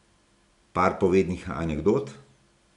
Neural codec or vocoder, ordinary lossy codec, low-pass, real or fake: none; none; 10.8 kHz; real